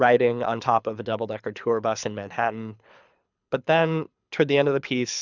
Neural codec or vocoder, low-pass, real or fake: codec, 24 kHz, 6 kbps, HILCodec; 7.2 kHz; fake